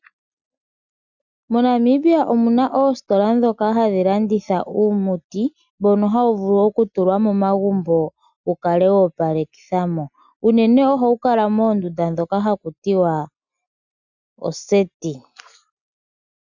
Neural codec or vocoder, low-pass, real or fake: none; 7.2 kHz; real